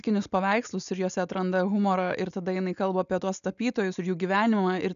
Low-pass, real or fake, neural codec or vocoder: 7.2 kHz; real; none